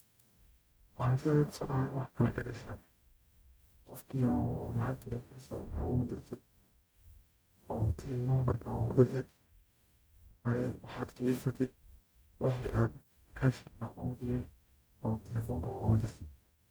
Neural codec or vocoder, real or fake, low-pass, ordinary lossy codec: codec, 44.1 kHz, 0.9 kbps, DAC; fake; none; none